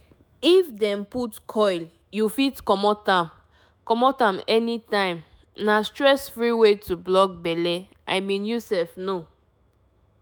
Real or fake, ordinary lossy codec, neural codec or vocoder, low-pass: fake; none; autoencoder, 48 kHz, 128 numbers a frame, DAC-VAE, trained on Japanese speech; none